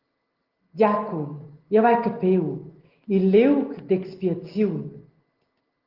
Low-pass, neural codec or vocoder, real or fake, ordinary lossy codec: 5.4 kHz; none; real; Opus, 16 kbps